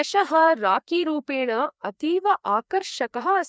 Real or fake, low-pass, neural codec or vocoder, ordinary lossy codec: fake; none; codec, 16 kHz, 2 kbps, FreqCodec, larger model; none